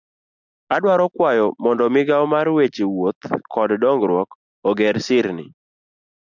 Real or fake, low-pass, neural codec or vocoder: real; 7.2 kHz; none